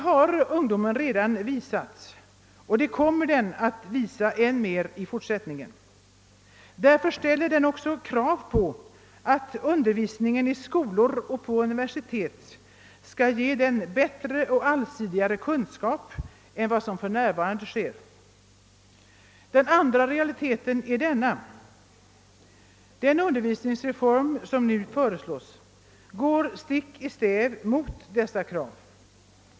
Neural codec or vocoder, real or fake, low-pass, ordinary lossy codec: none; real; none; none